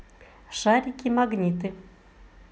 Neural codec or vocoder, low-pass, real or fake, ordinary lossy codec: none; none; real; none